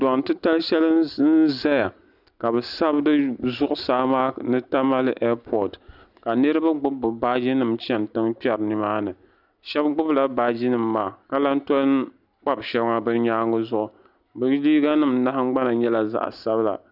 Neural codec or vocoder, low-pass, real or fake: none; 5.4 kHz; real